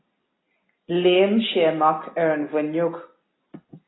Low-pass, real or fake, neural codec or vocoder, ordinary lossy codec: 7.2 kHz; real; none; AAC, 16 kbps